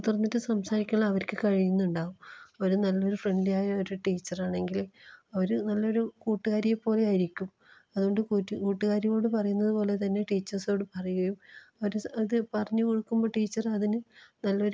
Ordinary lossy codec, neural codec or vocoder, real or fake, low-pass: none; none; real; none